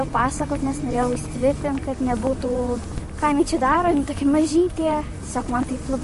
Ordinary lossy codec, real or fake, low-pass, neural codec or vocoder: MP3, 48 kbps; fake; 14.4 kHz; vocoder, 44.1 kHz, 128 mel bands, Pupu-Vocoder